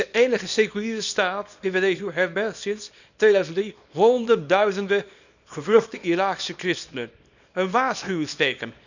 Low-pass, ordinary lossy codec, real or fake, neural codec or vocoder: 7.2 kHz; none; fake; codec, 24 kHz, 0.9 kbps, WavTokenizer, small release